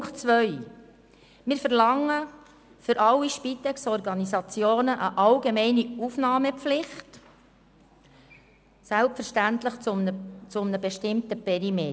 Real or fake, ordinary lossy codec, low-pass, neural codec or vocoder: real; none; none; none